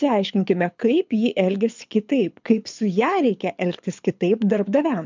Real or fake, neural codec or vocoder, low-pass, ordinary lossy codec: fake; codec, 24 kHz, 6 kbps, HILCodec; 7.2 kHz; MP3, 64 kbps